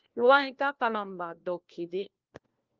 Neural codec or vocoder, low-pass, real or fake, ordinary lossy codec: codec, 16 kHz, 1 kbps, FunCodec, trained on LibriTTS, 50 frames a second; 7.2 kHz; fake; Opus, 32 kbps